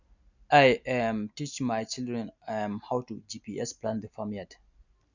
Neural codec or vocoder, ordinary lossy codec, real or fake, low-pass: none; none; real; 7.2 kHz